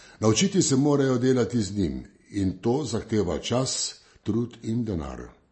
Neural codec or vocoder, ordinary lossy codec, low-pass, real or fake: none; MP3, 32 kbps; 10.8 kHz; real